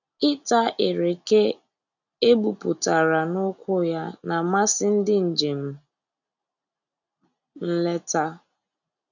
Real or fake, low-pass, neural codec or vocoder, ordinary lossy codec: real; 7.2 kHz; none; none